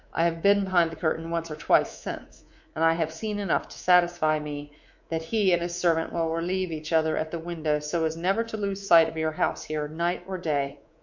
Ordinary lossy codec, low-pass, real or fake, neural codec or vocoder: MP3, 48 kbps; 7.2 kHz; fake; codec, 24 kHz, 3.1 kbps, DualCodec